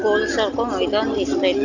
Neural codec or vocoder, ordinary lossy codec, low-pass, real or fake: none; none; 7.2 kHz; real